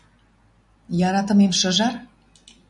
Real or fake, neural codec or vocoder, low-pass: real; none; 10.8 kHz